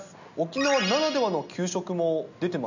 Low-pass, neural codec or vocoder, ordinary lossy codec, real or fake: 7.2 kHz; none; none; real